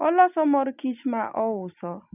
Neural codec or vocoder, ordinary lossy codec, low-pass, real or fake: none; none; 3.6 kHz; real